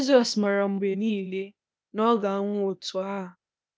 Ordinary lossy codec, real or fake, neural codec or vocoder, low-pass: none; fake; codec, 16 kHz, 0.8 kbps, ZipCodec; none